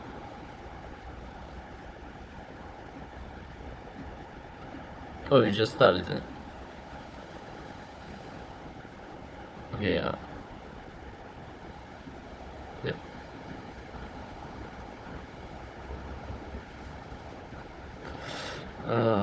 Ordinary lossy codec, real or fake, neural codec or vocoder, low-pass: none; fake; codec, 16 kHz, 4 kbps, FunCodec, trained on Chinese and English, 50 frames a second; none